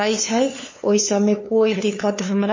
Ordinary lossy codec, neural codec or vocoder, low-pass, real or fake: MP3, 32 kbps; codec, 16 kHz, 2 kbps, FunCodec, trained on LibriTTS, 25 frames a second; 7.2 kHz; fake